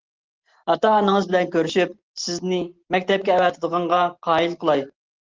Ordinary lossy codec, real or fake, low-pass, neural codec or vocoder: Opus, 16 kbps; real; 7.2 kHz; none